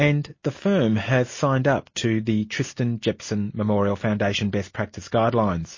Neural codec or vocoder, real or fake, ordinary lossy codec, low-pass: none; real; MP3, 32 kbps; 7.2 kHz